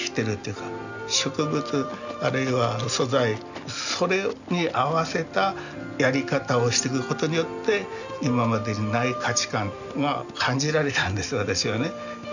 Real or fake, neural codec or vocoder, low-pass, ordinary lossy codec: real; none; 7.2 kHz; none